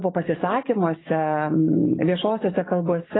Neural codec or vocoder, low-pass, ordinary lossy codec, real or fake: codec, 44.1 kHz, 7.8 kbps, DAC; 7.2 kHz; AAC, 16 kbps; fake